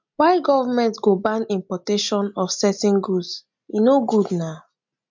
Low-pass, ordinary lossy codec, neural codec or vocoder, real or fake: 7.2 kHz; MP3, 64 kbps; none; real